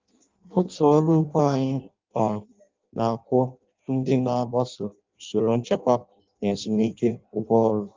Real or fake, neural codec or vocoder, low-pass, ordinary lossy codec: fake; codec, 16 kHz in and 24 kHz out, 0.6 kbps, FireRedTTS-2 codec; 7.2 kHz; Opus, 32 kbps